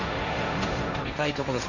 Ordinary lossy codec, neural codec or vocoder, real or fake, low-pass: none; codec, 16 kHz, 1.1 kbps, Voila-Tokenizer; fake; 7.2 kHz